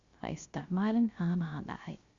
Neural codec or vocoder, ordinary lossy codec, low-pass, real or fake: codec, 16 kHz, 0.3 kbps, FocalCodec; Opus, 64 kbps; 7.2 kHz; fake